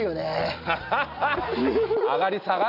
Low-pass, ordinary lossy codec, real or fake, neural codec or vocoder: 5.4 kHz; AAC, 48 kbps; real; none